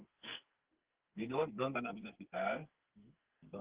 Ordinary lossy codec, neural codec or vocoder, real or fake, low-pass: Opus, 16 kbps; codec, 44.1 kHz, 2.6 kbps, SNAC; fake; 3.6 kHz